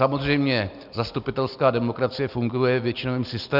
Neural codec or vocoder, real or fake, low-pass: none; real; 5.4 kHz